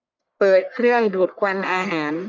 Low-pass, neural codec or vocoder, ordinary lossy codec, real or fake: 7.2 kHz; codec, 44.1 kHz, 1.7 kbps, Pupu-Codec; none; fake